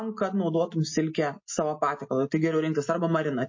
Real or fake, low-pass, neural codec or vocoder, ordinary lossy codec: real; 7.2 kHz; none; MP3, 32 kbps